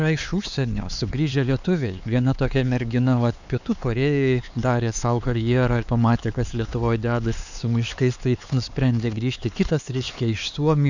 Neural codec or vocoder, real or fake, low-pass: codec, 16 kHz, 4 kbps, X-Codec, HuBERT features, trained on LibriSpeech; fake; 7.2 kHz